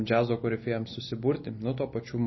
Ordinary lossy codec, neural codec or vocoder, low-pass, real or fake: MP3, 24 kbps; none; 7.2 kHz; real